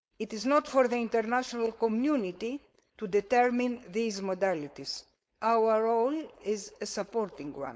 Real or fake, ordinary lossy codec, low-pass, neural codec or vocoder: fake; none; none; codec, 16 kHz, 4.8 kbps, FACodec